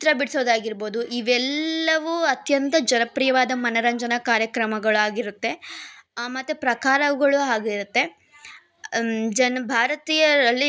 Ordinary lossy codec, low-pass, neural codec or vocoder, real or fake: none; none; none; real